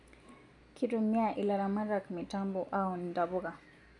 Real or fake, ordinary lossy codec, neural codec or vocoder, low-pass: real; none; none; 10.8 kHz